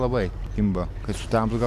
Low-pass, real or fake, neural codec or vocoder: 14.4 kHz; real; none